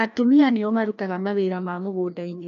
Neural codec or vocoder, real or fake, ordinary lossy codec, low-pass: codec, 16 kHz, 1 kbps, FreqCodec, larger model; fake; MP3, 64 kbps; 7.2 kHz